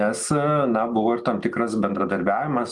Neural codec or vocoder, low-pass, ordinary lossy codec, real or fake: none; 10.8 kHz; Opus, 32 kbps; real